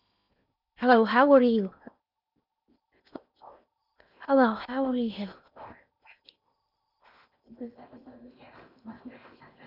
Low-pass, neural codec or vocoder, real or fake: 5.4 kHz; codec, 16 kHz in and 24 kHz out, 0.8 kbps, FocalCodec, streaming, 65536 codes; fake